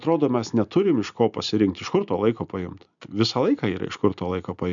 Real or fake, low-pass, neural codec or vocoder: real; 7.2 kHz; none